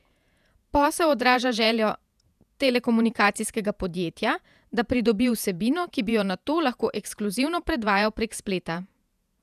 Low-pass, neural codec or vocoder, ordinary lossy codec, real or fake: 14.4 kHz; vocoder, 48 kHz, 128 mel bands, Vocos; none; fake